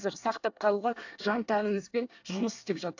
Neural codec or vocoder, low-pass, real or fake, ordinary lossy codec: codec, 24 kHz, 1 kbps, SNAC; 7.2 kHz; fake; AAC, 48 kbps